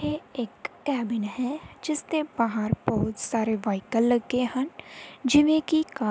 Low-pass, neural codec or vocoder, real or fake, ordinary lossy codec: none; none; real; none